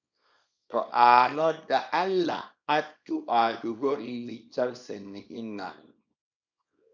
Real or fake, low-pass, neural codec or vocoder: fake; 7.2 kHz; codec, 24 kHz, 0.9 kbps, WavTokenizer, small release